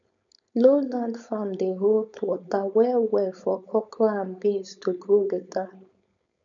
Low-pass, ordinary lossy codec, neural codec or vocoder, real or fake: 7.2 kHz; none; codec, 16 kHz, 4.8 kbps, FACodec; fake